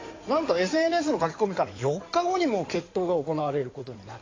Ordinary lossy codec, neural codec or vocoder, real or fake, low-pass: AAC, 32 kbps; codec, 16 kHz in and 24 kHz out, 2.2 kbps, FireRedTTS-2 codec; fake; 7.2 kHz